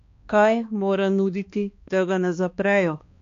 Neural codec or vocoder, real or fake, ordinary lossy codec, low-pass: codec, 16 kHz, 2 kbps, X-Codec, HuBERT features, trained on balanced general audio; fake; AAC, 48 kbps; 7.2 kHz